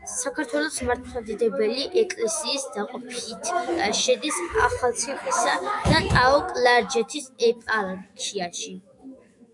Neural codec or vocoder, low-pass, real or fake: autoencoder, 48 kHz, 128 numbers a frame, DAC-VAE, trained on Japanese speech; 10.8 kHz; fake